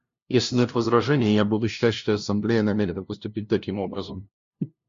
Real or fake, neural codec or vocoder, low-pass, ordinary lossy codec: fake; codec, 16 kHz, 0.5 kbps, FunCodec, trained on LibriTTS, 25 frames a second; 7.2 kHz; MP3, 48 kbps